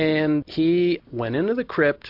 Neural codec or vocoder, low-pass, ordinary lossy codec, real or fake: none; 5.4 kHz; MP3, 48 kbps; real